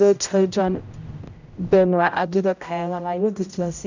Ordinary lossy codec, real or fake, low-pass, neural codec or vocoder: none; fake; 7.2 kHz; codec, 16 kHz, 0.5 kbps, X-Codec, HuBERT features, trained on general audio